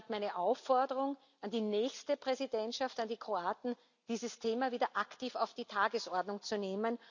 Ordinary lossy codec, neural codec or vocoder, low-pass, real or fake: none; none; 7.2 kHz; real